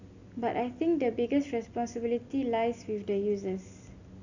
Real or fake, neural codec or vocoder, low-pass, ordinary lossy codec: real; none; 7.2 kHz; none